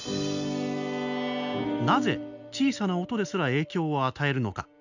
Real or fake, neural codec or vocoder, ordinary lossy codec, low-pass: real; none; none; 7.2 kHz